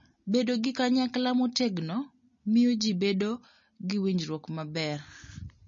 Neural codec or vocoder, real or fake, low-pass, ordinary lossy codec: none; real; 7.2 kHz; MP3, 32 kbps